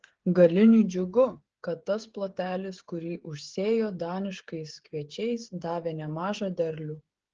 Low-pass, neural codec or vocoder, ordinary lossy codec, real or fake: 7.2 kHz; codec, 16 kHz, 8 kbps, FreqCodec, smaller model; Opus, 16 kbps; fake